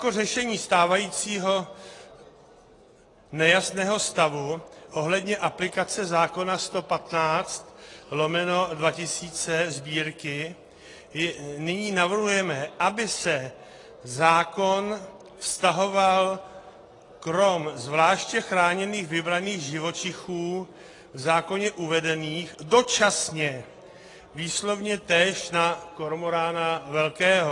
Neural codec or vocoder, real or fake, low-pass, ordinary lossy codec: none; real; 10.8 kHz; AAC, 32 kbps